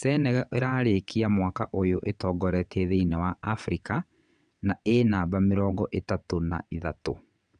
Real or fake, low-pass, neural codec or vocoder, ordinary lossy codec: fake; 9.9 kHz; vocoder, 22.05 kHz, 80 mel bands, WaveNeXt; none